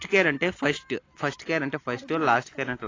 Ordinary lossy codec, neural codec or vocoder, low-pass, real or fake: AAC, 32 kbps; none; 7.2 kHz; real